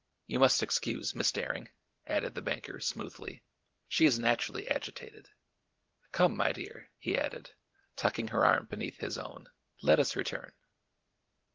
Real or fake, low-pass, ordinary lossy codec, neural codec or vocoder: real; 7.2 kHz; Opus, 16 kbps; none